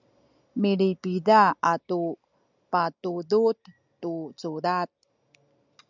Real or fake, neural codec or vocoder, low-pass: real; none; 7.2 kHz